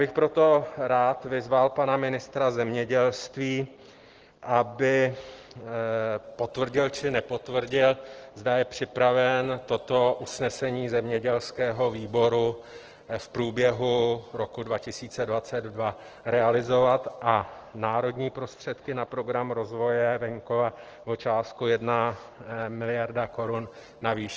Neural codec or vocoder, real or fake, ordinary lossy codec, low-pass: none; real; Opus, 16 kbps; 7.2 kHz